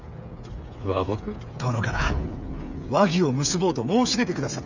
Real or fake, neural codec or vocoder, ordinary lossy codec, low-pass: fake; codec, 16 kHz, 8 kbps, FreqCodec, smaller model; none; 7.2 kHz